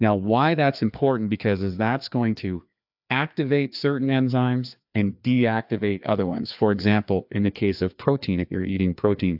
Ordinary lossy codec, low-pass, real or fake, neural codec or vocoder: AAC, 48 kbps; 5.4 kHz; fake; codec, 16 kHz, 2 kbps, FreqCodec, larger model